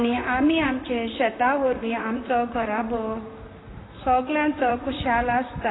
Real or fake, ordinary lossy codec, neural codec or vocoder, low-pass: fake; AAC, 16 kbps; vocoder, 44.1 kHz, 128 mel bands, Pupu-Vocoder; 7.2 kHz